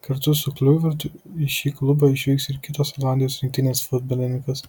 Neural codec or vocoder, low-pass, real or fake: none; 19.8 kHz; real